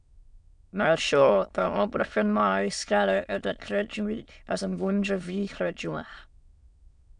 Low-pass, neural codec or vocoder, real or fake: 9.9 kHz; autoencoder, 22.05 kHz, a latent of 192 numbers a frame, VITS, trained on many speakers; fake